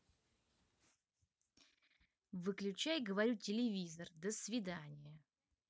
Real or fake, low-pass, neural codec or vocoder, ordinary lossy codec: real; none; none; none